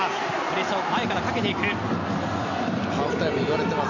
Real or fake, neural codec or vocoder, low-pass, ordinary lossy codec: real; none; 7.2 kHz; none